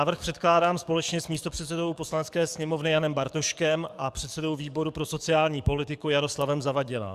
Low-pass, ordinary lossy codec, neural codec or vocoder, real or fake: 14.4 kHz; Opus, 64 kbps; codec, 44.1 kHz, 7.8 kbps, DAC; fake